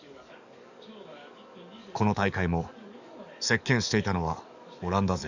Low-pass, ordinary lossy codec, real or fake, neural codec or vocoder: 7.2 kHz; none; fake; codec, 44.1 kHz, 7.8 kbps, DAC